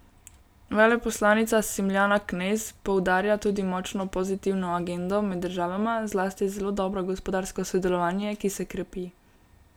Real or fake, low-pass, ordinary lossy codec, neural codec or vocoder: real; none; none; none